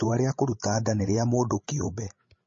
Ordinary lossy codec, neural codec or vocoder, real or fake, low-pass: MP3, 32 kbps; codec, 16 kHz, 16 kbps, FreqCodec, larger model; fake; 7.2 kHz